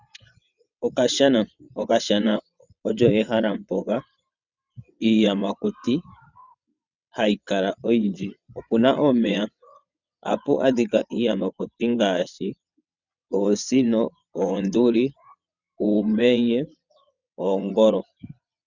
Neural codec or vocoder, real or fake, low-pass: vocoder, 22.05 kHz, 80 mel bands, WaveNeXt; fake; 7.2 kHz